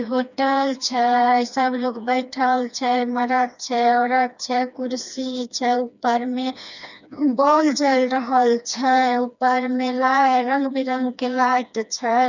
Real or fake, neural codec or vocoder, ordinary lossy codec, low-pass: fake; codec, 16 kHz, 2 kbps, FreqCodec, smaller model; none; 7.2 kHz